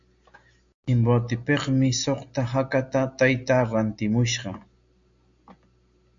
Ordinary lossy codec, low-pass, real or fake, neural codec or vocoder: AAC, 64 kbps; 7.2 kHz; real; none